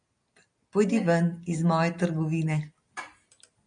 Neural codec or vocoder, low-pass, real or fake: none; 9.9 kHz; real